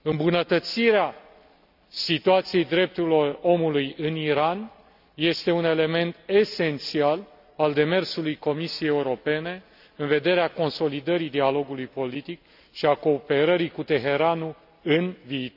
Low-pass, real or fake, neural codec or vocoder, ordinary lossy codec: 5.4 kHz; real; none; none